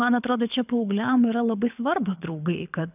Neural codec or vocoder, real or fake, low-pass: codec, 24 kHz, 6 kbps, HILCodec; fake; 3.6 kHz